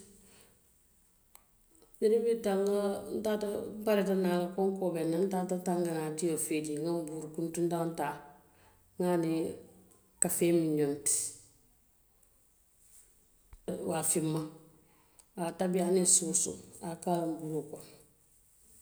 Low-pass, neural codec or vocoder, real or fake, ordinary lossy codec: none; none; real; none